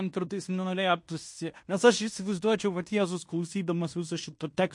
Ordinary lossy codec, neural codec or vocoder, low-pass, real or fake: MP3, 48 kbps; codec, 16 kHz in and 24 kHz out, 0.9 kbps, LongCat-Audio-Codec, fine tuned four codebook decoder; 10.8 kHz; fake